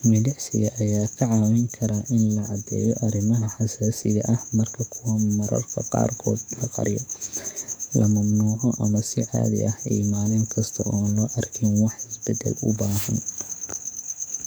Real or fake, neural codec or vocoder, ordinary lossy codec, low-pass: fake; codec, 44.1 kHz, 7.8 kbps, DAC; none; none